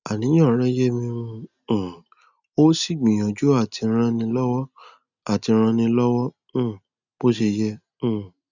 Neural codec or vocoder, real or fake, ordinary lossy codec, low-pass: none; real; none; 7.2 kHz